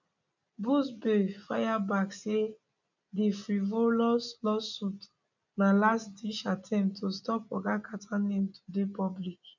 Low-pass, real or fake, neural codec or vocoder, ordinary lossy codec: 7.2 kHz; real; none; none